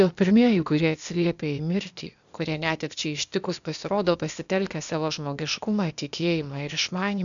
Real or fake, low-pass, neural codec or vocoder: fake; 7.2 kHz; codec, 16 kHz, 0.8 kbps, ZipCodec